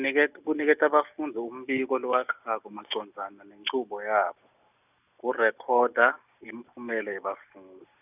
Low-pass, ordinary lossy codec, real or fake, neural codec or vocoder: 3.6 kHz; none; real; none